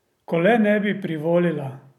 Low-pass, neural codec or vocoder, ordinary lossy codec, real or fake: 19.8 kHz; none; none; real